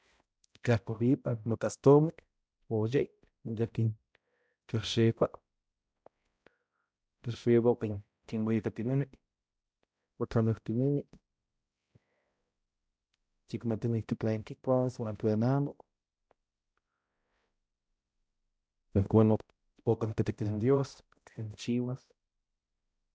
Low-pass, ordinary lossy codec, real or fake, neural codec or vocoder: none; none; fake; codec, 16 kHz, 0.5 kbps, X-Codec, HuBERT features, trained on balanced general audio